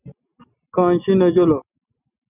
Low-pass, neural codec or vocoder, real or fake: 3.6 kHz; none; real